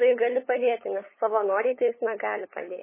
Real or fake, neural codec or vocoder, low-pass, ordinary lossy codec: fake; codec, 16 kHz, 8 kbps, FunCodec, trained on LibriTTS, 25 frames a second; 3.6 kHz; MP3, 16 kbps